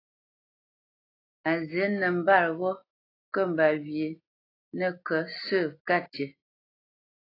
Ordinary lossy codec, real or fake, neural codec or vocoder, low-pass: AAC, 24 kbps; real; none; 5.4 kHz